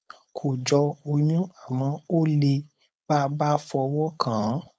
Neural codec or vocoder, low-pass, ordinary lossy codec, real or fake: codec, 16 kHz, 4.8 kbps, FACodec; none; none; fake